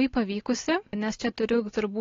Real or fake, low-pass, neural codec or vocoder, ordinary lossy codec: real; 7.2 kHz; none; AAC, 32 kbps